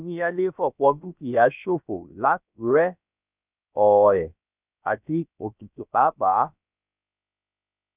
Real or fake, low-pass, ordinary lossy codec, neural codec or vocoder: fake; 3.6 kHz; none; codec, 16 kHz, about 1 kbps, DyCAST, with the encoder's durations